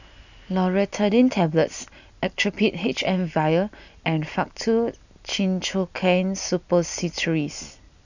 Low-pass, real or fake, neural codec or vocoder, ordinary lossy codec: 7.2 kHz; real; none; none